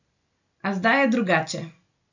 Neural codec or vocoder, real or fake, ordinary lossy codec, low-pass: none; real; none; 7.2 kHz